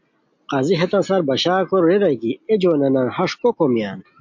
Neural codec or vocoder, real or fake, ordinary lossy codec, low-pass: none; real; MP3, 48 kbps; 7.2 kHz